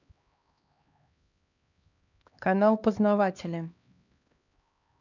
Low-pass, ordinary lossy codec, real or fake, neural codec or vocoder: 7.2 kHz; none; fake; codec, 16 kHz, 2 kbps, X-Codec, HuBERT features, trained on LibriSpeech